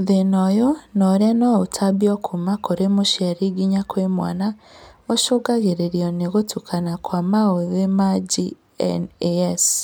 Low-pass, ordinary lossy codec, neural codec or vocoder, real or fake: none; none; none; real